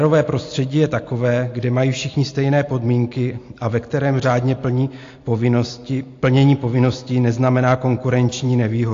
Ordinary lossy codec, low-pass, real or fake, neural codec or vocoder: AAC, 48 kbps; 7.2 kHz; real; none